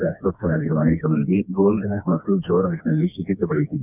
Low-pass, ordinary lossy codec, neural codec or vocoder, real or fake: 3.6 kHz; none; codec, 16 kHz, 2 kbps, FreqCodec, smaller model; fake